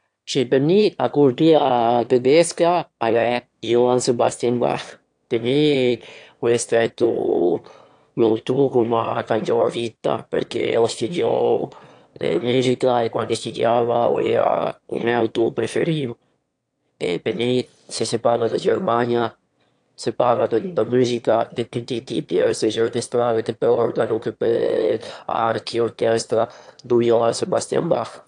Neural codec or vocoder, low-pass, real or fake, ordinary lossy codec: autoencoder, 22.05 kHz, a latent of 192 numbers a frame, VITS, trained on one speaker; 9.9 kHz; fake; AAC, 64 kbps